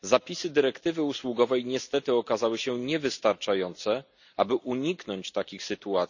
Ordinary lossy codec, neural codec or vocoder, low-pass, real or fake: none; none; 7.2 kHz; real